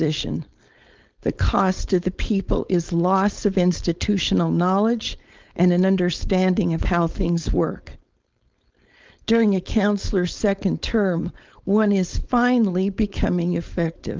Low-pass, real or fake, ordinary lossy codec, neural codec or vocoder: 7.2 kHz; fake; Opus, 32 kbps; codec, 16 kHz, 4.8 kbps, FACodec